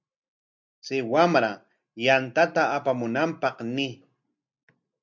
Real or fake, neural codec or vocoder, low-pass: real; none; 7.2 kHz